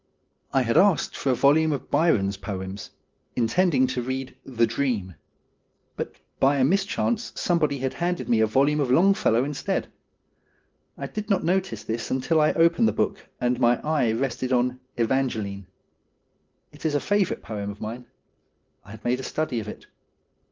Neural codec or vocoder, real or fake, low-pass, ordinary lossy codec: none; real; 7.2 kHz; Opus, 32 kbps